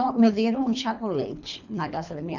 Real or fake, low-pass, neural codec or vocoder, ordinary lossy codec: fake; 7.2 kHz; codec, 24 kHz, 3 kbps, HILCodec; none